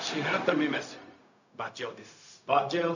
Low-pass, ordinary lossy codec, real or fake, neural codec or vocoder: 7.2 kHz; none; fake; codec, 16 kHz, 0.4 kbps, LongCat-Audio-Codec